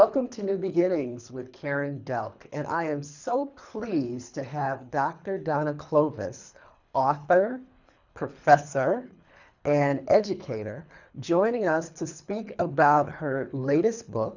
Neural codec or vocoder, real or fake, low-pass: codec, 24 kHz, 3 kbps, HILCodec; fake; 7.2 kHz